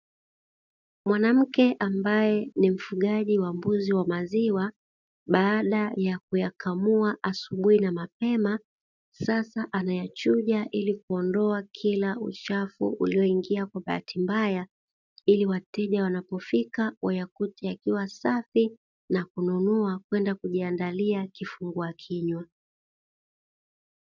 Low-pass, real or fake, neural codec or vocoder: 7.2 kHz; real; none